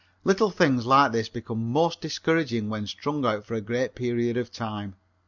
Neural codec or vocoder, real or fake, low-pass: none; real; 7.2 kHz